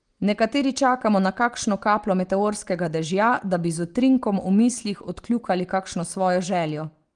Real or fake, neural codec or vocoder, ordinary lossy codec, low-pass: real; none; Opus, 24 kbps; 9.9 kHz